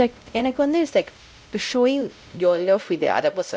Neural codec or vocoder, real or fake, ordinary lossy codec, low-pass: codec, 16 kHz, 0.5 kbps, X-Codec, WavLM features, trained on Multilingual LibriSpeech; fake; none; none